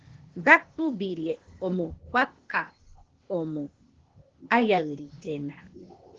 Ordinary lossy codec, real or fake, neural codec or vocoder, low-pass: Opus, 16 kbps; fake; codec, 16 kHz, 0.8 kbps, ZipCodec; 7.2 kHz